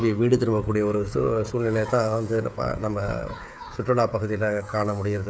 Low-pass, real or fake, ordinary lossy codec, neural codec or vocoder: none; fake; none; codec, 16 kHz, 16 kbps, FreqCodec, smaller model